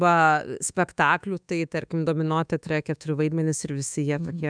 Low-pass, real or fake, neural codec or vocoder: 9.9 kHz; fake; codec, 24 kHz, 1.2 kbps, DualCodec